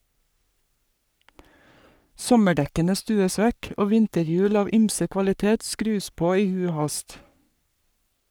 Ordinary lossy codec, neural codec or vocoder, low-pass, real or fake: none; codec, 44.1 kHz, 3.4 kbps, Pupu-Codec; none; fake